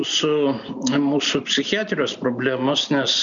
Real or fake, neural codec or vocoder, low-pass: real; none; 7.2 kHz